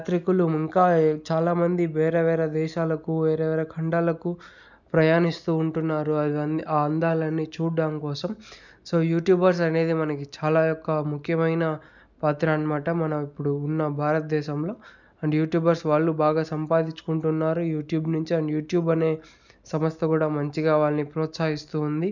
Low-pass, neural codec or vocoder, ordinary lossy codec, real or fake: 7.2 kHz; none; none; real